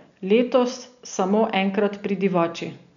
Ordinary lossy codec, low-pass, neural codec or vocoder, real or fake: none; 7.2 kHz; none; real